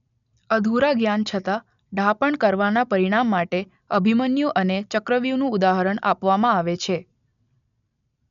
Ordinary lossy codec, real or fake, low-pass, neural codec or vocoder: none; real; 7.2 kHz; none